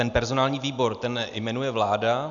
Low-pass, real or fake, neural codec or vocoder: 7.2 kHz; real; none